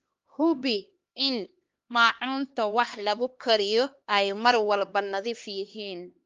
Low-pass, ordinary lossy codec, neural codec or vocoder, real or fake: 7.2 kHz; Opus, 32 kbps; codec, 16 kHz, 2 kbps, X-Codec, HuBERT features, trained on LibriSpeech; fake